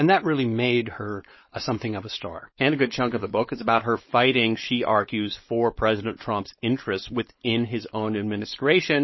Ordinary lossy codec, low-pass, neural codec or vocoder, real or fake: MP3, 24 kbps; 7.2 kHz; codec, 16 kHz, 4.8 kbps, FACodec; fake